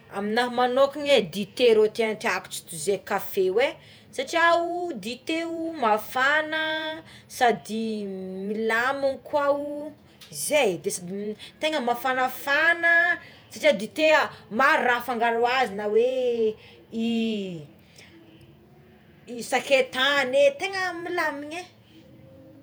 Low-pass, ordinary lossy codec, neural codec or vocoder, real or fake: none; none; vocoder, 48 kHz, 128 mel bands, Vocos; fake